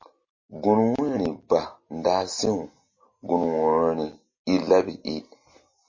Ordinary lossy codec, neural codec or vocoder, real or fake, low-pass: MP3, 32 kbps; none; real; 7.2 kHz